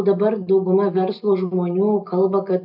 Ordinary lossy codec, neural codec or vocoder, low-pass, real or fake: AAC, 48 kbps; none; 5.4 kHz; real